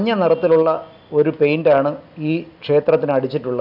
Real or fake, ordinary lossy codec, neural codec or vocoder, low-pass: real; none; none; 5.4 kHz